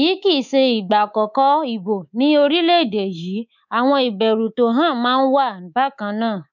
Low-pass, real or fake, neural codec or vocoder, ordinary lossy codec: 7.2 kHz; fake; codec, 24 kHz, 3.1 kbps, DualCodec; none